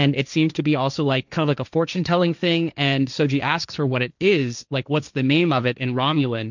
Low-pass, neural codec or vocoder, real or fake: 7.2 kHz; codec, 16 kHz, 1.1 kbps, Voila-Tokenizer; fake